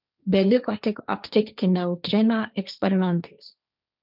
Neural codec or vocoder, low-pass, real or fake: codec, 16 kHz, 1.1 kbps, Voila-Tokenizer; 5.4 kHz; fake